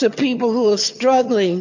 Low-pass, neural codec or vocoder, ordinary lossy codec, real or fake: 7.2 kHz; vocoder, 22.05 kHz, 80 mel bands, HiFi-GAN; MP3, 48 kbps; fake